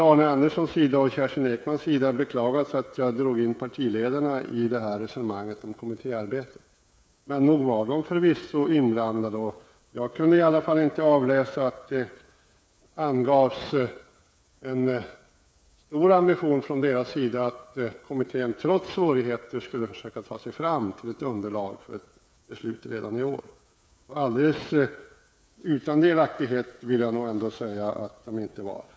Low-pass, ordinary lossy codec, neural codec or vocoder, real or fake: none; none; codec, 16 kHz, 8 kbps, FreqCodec, smaller model; fake